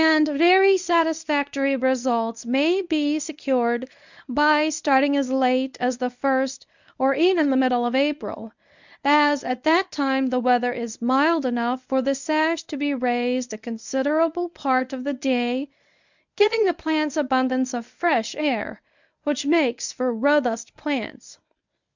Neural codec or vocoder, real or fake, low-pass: codec, 24 kHz, 0.9 kbps, WavTokenizer, medium speech release version 1; fake; 7.2 kHz